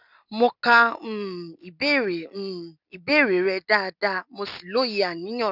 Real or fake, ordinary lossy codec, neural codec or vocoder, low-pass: real; none; none; 5.4 kHz